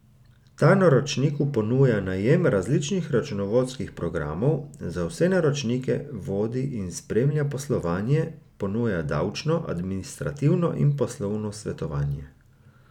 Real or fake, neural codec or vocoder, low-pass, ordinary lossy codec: real; none; 19.8 kHz; none